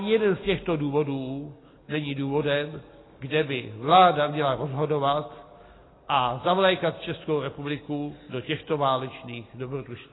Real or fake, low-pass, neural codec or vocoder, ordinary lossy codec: real; 7.2 kHz; none; AAC, 16 kbps